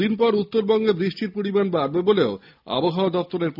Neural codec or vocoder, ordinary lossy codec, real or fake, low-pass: none; none; real; 5.4 kHz